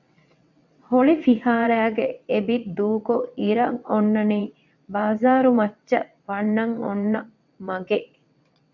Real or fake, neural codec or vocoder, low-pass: fake; vocoder, 22.05 kHz, 80 mel bands, WaveNeXt; 7.2 kHz